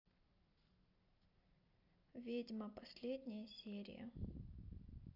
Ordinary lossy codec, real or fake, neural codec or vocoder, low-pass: AAC, 48 kbps; real; none; 5.4 kHz